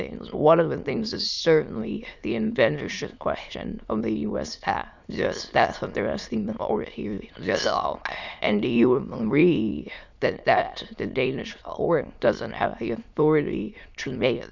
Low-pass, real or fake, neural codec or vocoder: 7.2 kHz; fake; autoencoder, 22.05 kHz, a latent of 192 numbers a frame, VITS, trained on many speakers